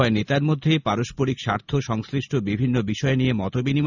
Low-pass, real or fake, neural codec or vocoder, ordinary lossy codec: 7.2 kHz; real; none; none